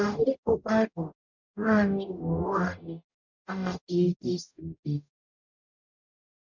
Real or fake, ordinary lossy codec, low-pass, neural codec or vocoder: fake; none; 7.2 kHz; codec, 44.1 kHz, 0.9 kbps, DAC